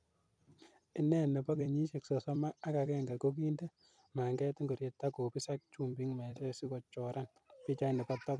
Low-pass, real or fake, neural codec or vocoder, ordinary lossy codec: 9.9 kHz; fake; vocoder, 44.1 kHz, 128 mel bands every 256 samples, BigVGAN v2; none